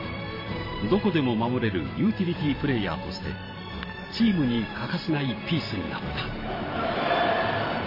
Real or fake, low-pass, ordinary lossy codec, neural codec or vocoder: fake; 5.4 kHz; MP3, 24 kbps; vocoder, 44.1 kHz, 128 mel bands every 512 samples, BigVGAN v2